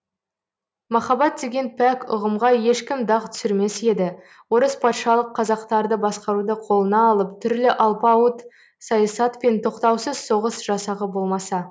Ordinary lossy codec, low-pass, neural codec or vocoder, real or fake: none; none; none; real